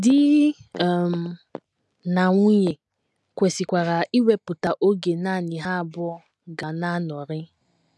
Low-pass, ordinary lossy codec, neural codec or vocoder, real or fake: none; none; none; real